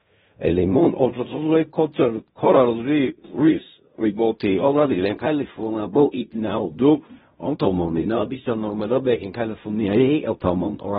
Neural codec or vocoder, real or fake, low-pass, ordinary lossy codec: codec, 16 kHz in and 24 kHz out, 0.4 kbps, LongCat-Audio-Codec, fine tuned four codebook decoder; fake; 10.8 kHz; AAC, 16 kbps